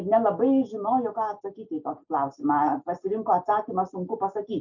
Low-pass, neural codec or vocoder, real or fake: 7.2 kHz; none; real